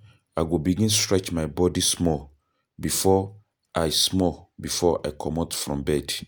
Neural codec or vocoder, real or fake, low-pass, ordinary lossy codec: none; real; none; none